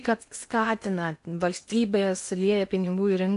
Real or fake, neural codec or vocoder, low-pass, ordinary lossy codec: fake; codec, 16 kHz in and 24 kHz out, 0.8 kbps, FocalCodec, streaming, 65536 codes; 10.8 kHz; AAC, 64 kbps